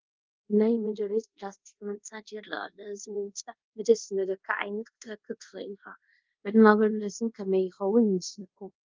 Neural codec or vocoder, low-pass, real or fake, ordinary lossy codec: codec, 24 kHz, 0.5 kbps, DualCodec; 7.2 kHz; fake; Opus, 32 kbps